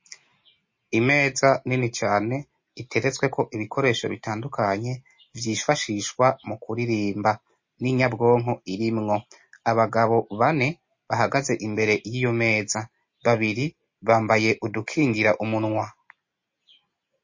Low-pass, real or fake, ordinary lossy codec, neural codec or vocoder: 7.2 kHz; real; MP3, 32 kbps; none